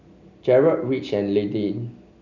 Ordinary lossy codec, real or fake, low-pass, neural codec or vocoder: none; real; 7.2 kHz; none